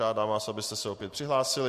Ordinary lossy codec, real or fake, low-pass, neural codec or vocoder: MP3, 64 kbps; real; 14.4 kHz; none